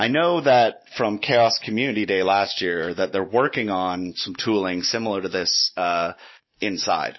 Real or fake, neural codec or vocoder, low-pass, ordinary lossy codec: real; none; 7.2 kHz; MP3, 24 kbps